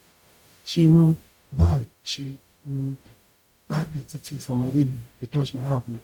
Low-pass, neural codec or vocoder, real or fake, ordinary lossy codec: 19.8 kHz; codec, 44.1 kHz, 0.9 kbps, DAC; fake; none